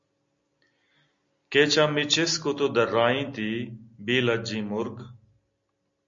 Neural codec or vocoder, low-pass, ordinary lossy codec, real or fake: none; 7.2 kHz; MP3, 48 kbps; real